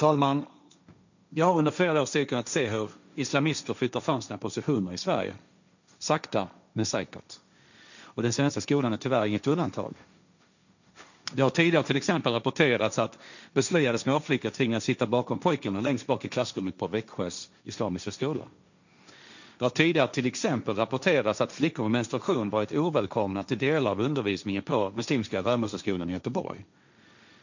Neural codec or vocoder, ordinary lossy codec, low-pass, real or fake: codec, 16 kHz, 1.1 kbps, Voila-Tokenizer; none; 7.2 kHz; fake